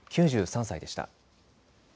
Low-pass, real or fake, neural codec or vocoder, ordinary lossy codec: none; real; none; none